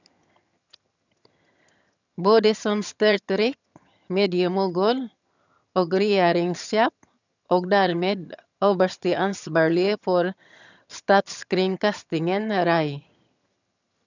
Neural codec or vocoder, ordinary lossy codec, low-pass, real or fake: vocoder, 22.05 kHz, 80 mel bands, HiFi-GAN; none; 7.2 kHz; fake